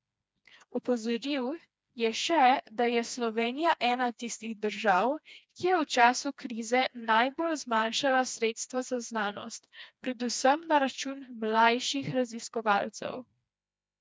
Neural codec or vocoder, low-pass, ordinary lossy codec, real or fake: codec, 16 kHz, 2 kbps, FreqCodec, smaller model; none; none; fake